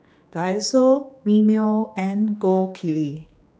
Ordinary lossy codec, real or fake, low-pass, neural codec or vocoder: none; fake; none; codec, 16 kHz, 2 kbps, X-Codec, HuBERT features, trained on general audio